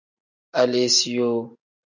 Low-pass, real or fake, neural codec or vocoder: 7.2 kHz; real; none